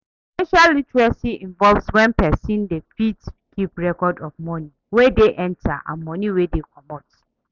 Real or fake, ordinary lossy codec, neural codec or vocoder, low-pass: real; none; none; 7.2 kHz